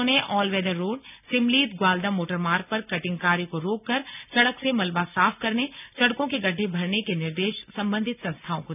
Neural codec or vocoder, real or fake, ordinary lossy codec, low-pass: none; real; none; 3.6 kHz